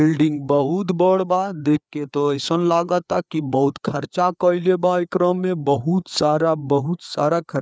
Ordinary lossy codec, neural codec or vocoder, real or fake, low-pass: none; codec, 16 kHz, 4 kbps, FreqCodec, larger model; fake; none